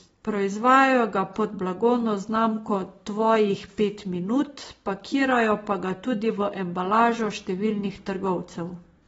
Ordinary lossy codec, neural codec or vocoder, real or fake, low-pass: AAC, 24 kbps; none; real; 19.8 kHz